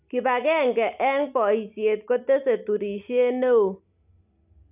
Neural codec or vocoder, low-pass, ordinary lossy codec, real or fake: none; 3.6 kHz; none; real